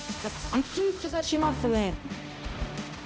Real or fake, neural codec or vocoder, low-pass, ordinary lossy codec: fake; codec, 16 kHz, 0.5 kbps, X-Codec, HuBERT features, trained on balanced general audio; none; none